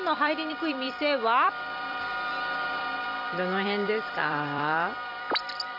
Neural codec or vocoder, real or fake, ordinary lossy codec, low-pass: vocoder, 44.1 kHz, 128 mel bands every 256 samples, BigVGAN v2; fake; none; 5.4 kHz